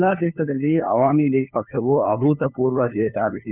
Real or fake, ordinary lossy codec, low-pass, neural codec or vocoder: fake; none; 3.6 kHz; codec, 16 kHz, 2 kbps, FunCodec, trained on LibriTTS, 25 frames a second